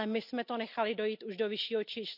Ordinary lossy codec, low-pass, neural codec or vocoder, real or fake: none; 5.4 kHz; none; real